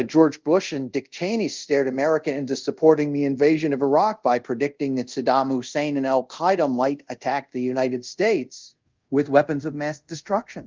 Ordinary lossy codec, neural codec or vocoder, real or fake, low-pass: Opus, 32 kbps; codec, 24 kHz, 0.5 kbps, DualCodec; fake; 7.2 kHz